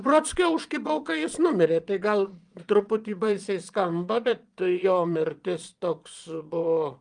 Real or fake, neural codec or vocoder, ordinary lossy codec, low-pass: fake; vocoder, 22.05 kHz, 80 mel bands, Vocos; Opus, 32 kbps; 9.9 kHz